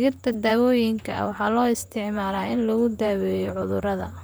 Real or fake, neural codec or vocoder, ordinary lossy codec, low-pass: fake; vocoder, 44.1 kHz, 128 mel bands, Pupu-Vocoder; none; none